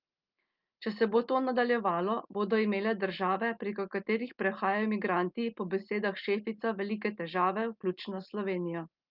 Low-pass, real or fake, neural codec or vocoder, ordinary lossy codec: 5.4 kHz; real; none; Opus, 24 kbps